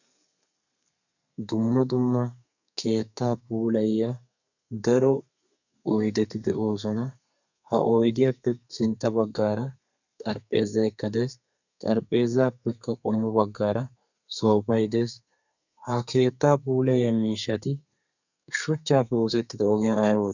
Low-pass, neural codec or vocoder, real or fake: 7.2 kHz; codec, 32 kHz, 1.9 kbps, SNAC; fake